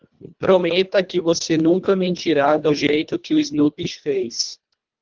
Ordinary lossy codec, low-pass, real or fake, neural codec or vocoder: Opus, 32 kbps; 7.2 kHz; fake; codec, 24 kHz, 1.5 kbps, HILCodec